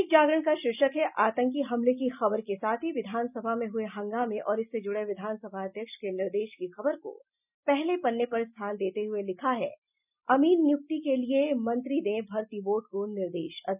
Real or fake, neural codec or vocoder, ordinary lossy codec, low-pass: real; none; none; 3.6 kHz